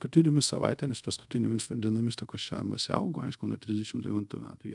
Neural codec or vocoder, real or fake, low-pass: codec, 24 kHz, 0.5 kbps, DualCodec; fake; 10.8 kHz